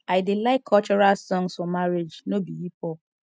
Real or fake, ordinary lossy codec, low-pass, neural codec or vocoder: real; none; none; none